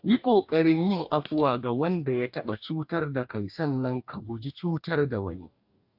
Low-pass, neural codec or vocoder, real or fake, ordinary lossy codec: 5.4 kHz; codec, 44.1 kHz, 2.6 kbps, DAC; fake; AAC, 48 kbps